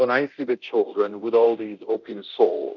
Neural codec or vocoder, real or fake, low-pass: codec, 24 kHz, 0.9 kbps, DualCodec; fake; 7.2 kHz